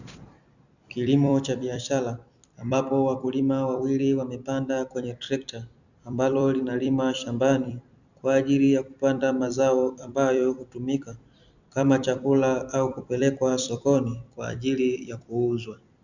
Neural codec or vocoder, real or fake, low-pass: vocoder, 44.1 kHz, 128 mel bands every 256 samples, BigVGAN v2; fake; 7.2 kHz